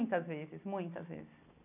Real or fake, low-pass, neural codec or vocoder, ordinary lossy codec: real; 3.6 kHz; none; none